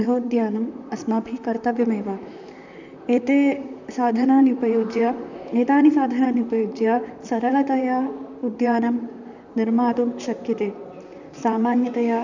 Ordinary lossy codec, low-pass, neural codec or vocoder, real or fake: none; 7.2 kHz; vocoder, 44.1 kHz, 128 mel bands, Pupu-Vocoder; fake